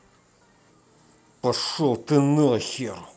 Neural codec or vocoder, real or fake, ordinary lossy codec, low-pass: none; real; none; none